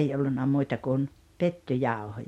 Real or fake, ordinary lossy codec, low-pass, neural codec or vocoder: real; MP3, 64 kbps; 19.8 kHz; none